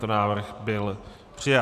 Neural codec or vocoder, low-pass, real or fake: vocoder, 44.1 kHz, 128 mel bands every 512 samples, BigVGAN v2; 14.4 kHz; fake